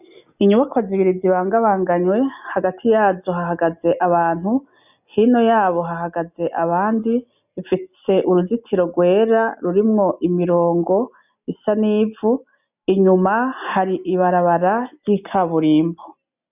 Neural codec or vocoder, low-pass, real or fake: none; 3.6 kHz; real